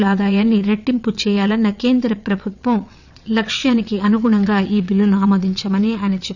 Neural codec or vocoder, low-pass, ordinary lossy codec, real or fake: vocoder, 22.05 kHz, 80 mel bands, WaveNeXt; 7.2 kHz; none; fake